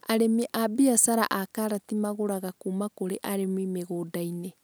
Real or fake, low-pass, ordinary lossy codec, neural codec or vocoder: real; none; none; none